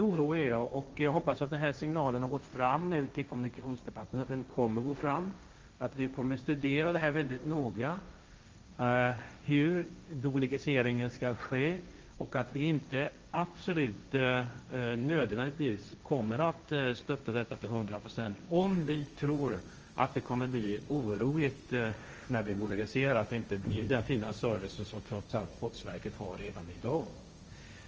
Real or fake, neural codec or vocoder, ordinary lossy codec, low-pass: fake; codec, 16 kHz, 1.1 kbps, Voila-Tokenizer; Opus, 24 kbps; 7.2 kHz